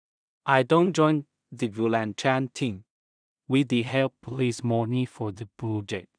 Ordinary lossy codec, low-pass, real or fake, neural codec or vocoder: none; 9.9 kHz; fake; codec, 16 kHz in and 24 kHz out, 0.4 kbps, LongCat-Audio-Codec, two codebook decoder